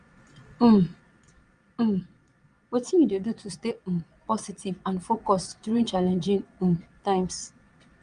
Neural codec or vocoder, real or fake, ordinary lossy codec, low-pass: vocoder, 22.05 kHz, 80 mel bands, Vocos; fake; Opus, 32 kbps; 9.9 kHz